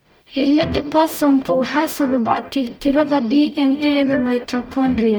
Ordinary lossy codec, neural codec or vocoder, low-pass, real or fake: none; codec, 44.1 kHz, 0.9 kbps, DAC; none; fake